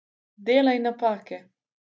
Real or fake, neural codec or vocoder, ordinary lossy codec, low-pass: real; none; none; none